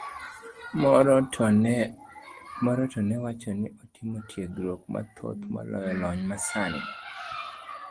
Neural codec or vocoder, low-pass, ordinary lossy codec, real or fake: none; 9.9 kHz; Opus, 24 kbps; real